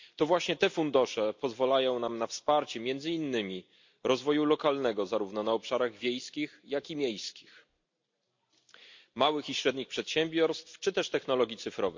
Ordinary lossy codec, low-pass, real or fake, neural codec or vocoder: MP3, 48 kbps; 7.2 kHz; real; none